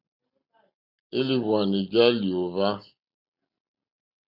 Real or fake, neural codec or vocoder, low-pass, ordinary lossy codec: real; none; 5.4 kHz; MP3, 48 kbps